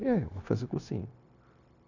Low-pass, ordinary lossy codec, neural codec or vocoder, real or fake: 7.2 kHz; none; codec, 16 kHz, 0.9 kbps, LongCat-Audio-Codec; fake